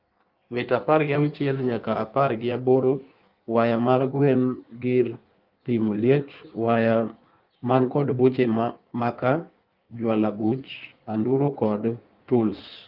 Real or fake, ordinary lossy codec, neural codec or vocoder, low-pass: fake; Opus, 24 kbps; codec, 16 kHz in and 24 kHz out, 1.1 kbps, FireRedTTS-2 codec; 5.4 kHz